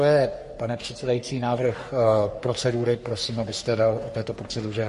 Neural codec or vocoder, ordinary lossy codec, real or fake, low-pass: codec, 44.1 kHz, 3.4 kbps, Pupu-Codec; MP3, 48 kbps; fake; 14.4 kHz